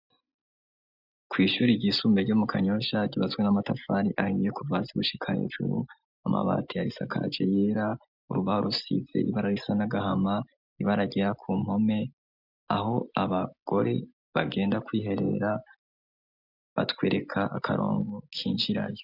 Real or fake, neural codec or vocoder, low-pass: real; none; 5.4 kHz